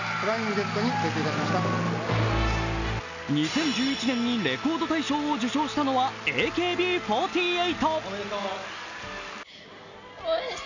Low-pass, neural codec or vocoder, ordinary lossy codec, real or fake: 7.2 kHz; none; none; real